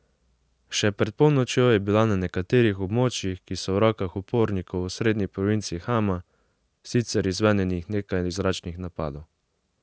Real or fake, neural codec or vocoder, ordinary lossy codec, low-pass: real; none; none; none